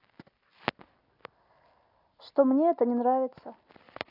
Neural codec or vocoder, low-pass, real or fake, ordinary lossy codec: none; 5.4 kHz; real; AAC, 48 kbps